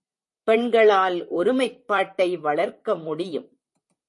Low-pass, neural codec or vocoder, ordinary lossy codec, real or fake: 10.8 kHz; vocoder, 44.1 kHz, 128 mel bands, Pupu-Vocoder; MP3, 48 kbps; fake